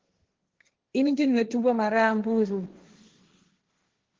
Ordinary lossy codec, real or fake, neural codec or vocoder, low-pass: Opus, 16 kbps; fake; codec, 16 kHz, 1.1 kbps, Voila-Tokenizer; 7.2 kHz